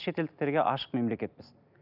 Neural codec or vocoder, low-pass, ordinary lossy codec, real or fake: none; 5.4 kHz; none; real